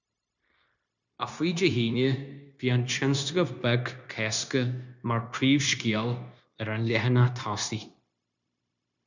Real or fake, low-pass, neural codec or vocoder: fake; 7.2 kHz; codec, 16 kHz, 0.9 kbps, LongCat-Audio-Codec